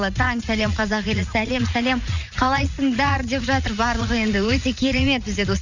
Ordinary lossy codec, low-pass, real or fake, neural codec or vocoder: none; 7.2 kHz; fake; vocoder, 44.1 kHz, 80 mel bands, Vocos